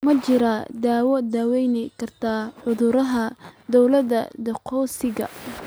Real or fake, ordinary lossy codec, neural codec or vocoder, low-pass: real; none; none; none